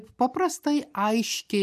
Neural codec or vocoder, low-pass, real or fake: none; 14.4 kHz; real